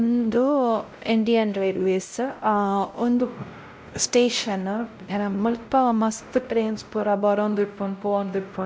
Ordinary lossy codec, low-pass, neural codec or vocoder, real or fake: none; none; codec, 16 kHz, 0.5 kbps, X-Codec, WavLM features, trained on Multilingual LibriSpeech; fake